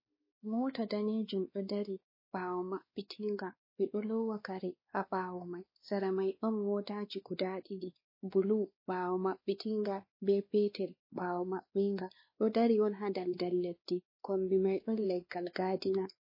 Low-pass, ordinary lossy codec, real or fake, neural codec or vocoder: 5.4 kHz; MP3, 24 kbps; fake; codec, 16 kHz, 2 kbps, X-Codec, WavLM features, trained on Multilingual LibriSpeech